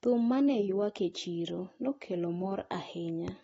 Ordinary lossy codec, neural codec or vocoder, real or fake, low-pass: AAC, 24 kbps; none; real; 10.8 kHz